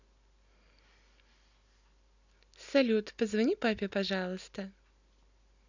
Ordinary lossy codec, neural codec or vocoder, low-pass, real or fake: none; none; 7.2 kHz; real